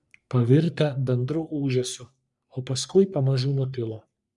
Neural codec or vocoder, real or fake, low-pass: codec, 44.1 kHz, 3.4 kbps, Pupu-Codec; fake; 10.8 kHz